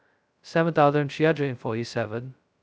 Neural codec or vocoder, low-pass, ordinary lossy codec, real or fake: codec, 16 kHz, 0.2 kbps, FocalCodec; none; none; fake